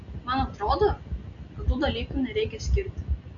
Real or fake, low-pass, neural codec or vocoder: real; 7.2 kHz; none